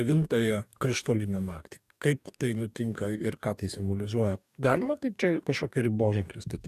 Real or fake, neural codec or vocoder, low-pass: fake; codec, 44.1 kHz, 2.6 kbps, DAC; 14.4 kHz